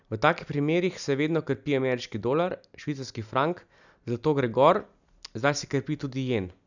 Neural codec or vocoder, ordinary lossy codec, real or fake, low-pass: none; none; real; 7.2 kHz